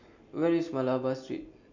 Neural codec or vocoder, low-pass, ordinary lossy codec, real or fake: none; 7.2 kHz; none; real